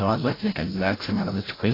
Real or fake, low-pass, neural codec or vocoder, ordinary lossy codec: fake; 5.4 kHz; codec, 16 kHz, 0.5 kbps, FreqCodec, larger model; AAC, 24 kbps